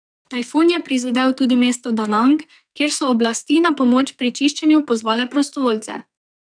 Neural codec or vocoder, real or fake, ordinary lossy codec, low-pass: codec, 44.1 kHz, 2.6 kbps, SNAC; fake; none; 9.9 kHz